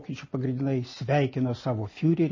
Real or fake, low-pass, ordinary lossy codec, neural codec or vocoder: real; 7.2 kHz; MP3, 32 kbps; none